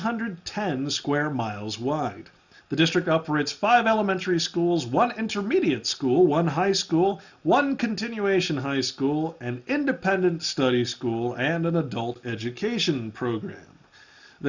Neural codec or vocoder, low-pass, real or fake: none; 7.2 kHz; real